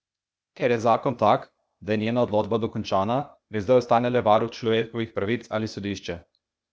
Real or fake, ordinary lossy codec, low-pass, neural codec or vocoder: fake; none; none; codec, 16 kHz, 0.8 kbps, ZipCodec